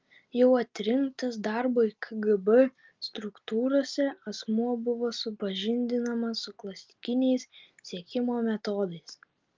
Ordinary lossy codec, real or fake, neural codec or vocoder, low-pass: Opus, 24 kbps; real; none; 7.2 kHz